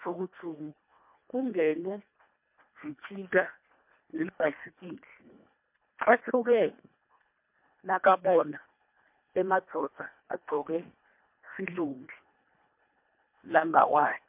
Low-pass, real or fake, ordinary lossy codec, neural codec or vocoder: 3.6 kHz; fake; MP3, 32 kbps; codec, 24 kHz, 1.5 kbps, HILCodec